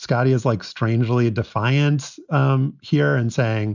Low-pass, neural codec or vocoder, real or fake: 7.2 kHz; none; real